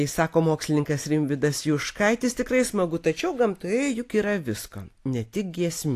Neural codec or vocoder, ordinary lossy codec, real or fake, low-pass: none; AAC, 64 kbps; real; 14.4 kHz